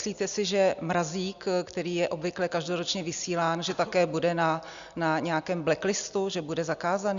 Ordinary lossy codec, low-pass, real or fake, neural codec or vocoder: Opus, 64 kbps; 7.2 kHz; real; none